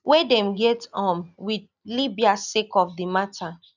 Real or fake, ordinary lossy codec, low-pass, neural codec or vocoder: real; none; 7.2 kHz; none